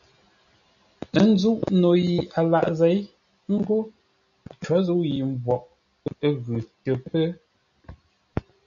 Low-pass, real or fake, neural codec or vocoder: 7.2 kHz; real; none